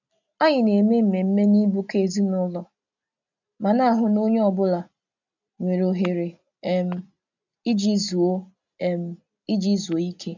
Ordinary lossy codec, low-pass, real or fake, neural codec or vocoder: none; 7.2 kHz; real; none